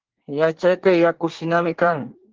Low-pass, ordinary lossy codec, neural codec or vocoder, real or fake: 7.2 kHz; Opus, 16 kbps; codec, 24 kHz, 1 kbps, SNAC; fake